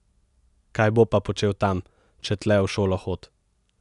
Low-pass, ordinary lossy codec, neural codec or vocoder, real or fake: 10.8 kHz; none; none; real